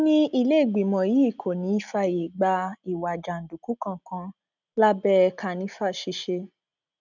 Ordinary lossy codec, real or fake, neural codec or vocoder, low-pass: none; real; none; 7.2 kHz